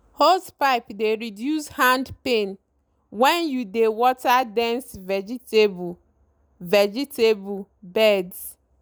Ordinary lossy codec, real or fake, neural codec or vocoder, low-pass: none; real; none; none